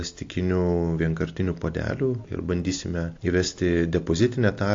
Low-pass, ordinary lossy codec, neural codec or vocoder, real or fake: 7.2 kHz; AAC, 48 kbps; none; real